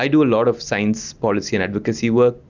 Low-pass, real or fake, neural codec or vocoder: 7.2 kHz; real; none